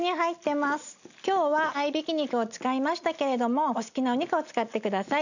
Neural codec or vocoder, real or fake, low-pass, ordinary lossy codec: none; real; 7.2 kHz; none